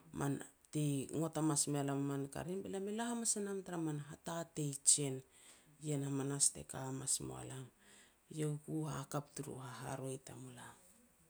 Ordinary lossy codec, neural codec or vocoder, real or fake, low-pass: none; none; real; none